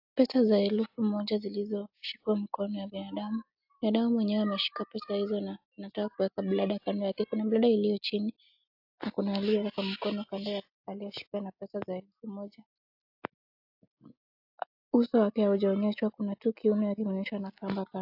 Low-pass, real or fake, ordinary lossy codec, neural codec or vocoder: 5.4 kHz; real; AAC, 48 kbps; none